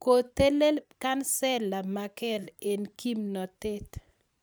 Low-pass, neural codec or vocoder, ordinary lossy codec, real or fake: none; vocoder, 44.1 kHz, 128 mel bands every 512 samples, BigVGAN v2; none; fake